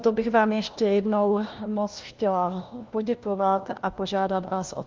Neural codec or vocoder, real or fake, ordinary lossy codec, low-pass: codec, 16 kHz, 1 kbps, FunCodec, trained on LibriTTS, 50 frames a second; fake; Opus, 32 kbps; 7.2 kHz